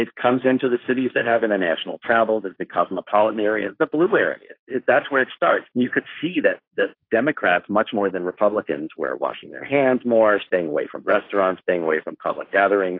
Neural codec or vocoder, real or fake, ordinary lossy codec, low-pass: codec, 16 kHz, 1.1 kbps, Voila-Tokenizer; fake; AAC, 32 kbps; 5.4 kHz